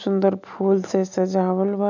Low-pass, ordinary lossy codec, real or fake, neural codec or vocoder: 7.2 kHz; none; real; none